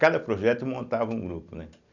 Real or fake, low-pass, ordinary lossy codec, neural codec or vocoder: real; 7.2 kHz; none; none